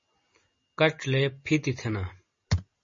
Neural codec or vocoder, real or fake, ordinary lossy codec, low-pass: none; real; MP3, 32 kbps; 7.2 kHz